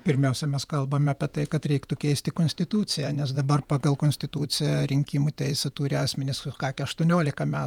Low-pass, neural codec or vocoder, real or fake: 19.8 kHz; vocoder, 48 kHz, 128 mel bands, Vocos; fake